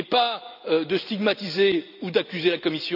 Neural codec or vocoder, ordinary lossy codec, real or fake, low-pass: none; none; real; 5.4 kHz